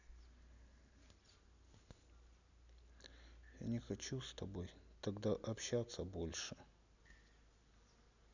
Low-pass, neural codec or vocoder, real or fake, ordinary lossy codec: 7.2 kHz; none; real; none